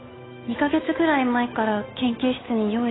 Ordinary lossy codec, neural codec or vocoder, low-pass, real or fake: AAC, 16 kbps; codec, 16 kHz, 8 kbps, FunCodec, trained on Chinese and English, 25 frames a second; 7.2 kHz; fake